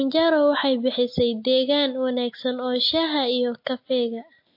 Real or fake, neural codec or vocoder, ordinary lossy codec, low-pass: real; none; MP3, 32 kbps; 5.4 kHz